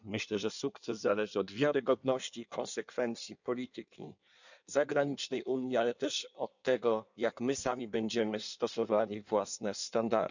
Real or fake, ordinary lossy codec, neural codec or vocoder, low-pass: fake; none; codec, 16 kHz in and 24 kHz out, 1.1 kbps, FireRedTTS-2 codec; 7.2 kHz